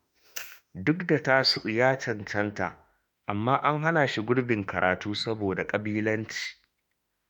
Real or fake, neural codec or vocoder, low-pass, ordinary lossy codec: fake; autoencoder, 48 kHz, 32 numbers a frame, DAC-VAE, trained on Japanese speech; none; none